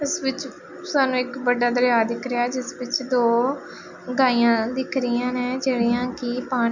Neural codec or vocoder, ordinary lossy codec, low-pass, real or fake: none; none; 7.2 kHz; real